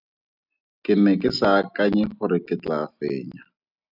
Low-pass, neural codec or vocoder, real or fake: 5.4 kHz; none; real